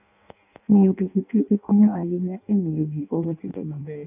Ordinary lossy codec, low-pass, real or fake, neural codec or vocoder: none; 3.6 kHz; fake; codec, 16 kHz in and 24 kHz out, 0.6 kbps, FireRedTTS-2 codec